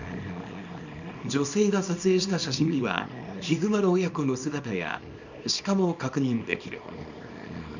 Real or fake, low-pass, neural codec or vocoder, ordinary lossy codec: fake; 7.2 kHz; codec, 24 kHz, 0.9 kbps, WavTokenizer, small release; none